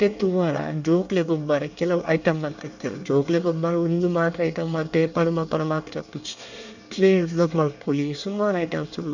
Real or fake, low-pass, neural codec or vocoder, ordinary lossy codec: fake; 7.2 kHz; codec, 24 kHz, 1 kbps, SNAC; none